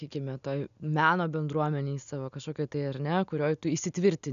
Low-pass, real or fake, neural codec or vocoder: 7.2 kHz; real; none